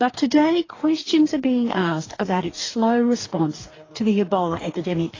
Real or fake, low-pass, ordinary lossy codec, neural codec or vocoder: fake; 7.2 kHz; AAC, 32 kbps; codec, 44.1 kHz, 2.6 kbps, DAC